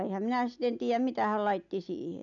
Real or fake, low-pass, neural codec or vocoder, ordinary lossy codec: real; 7.2 kHz; none; none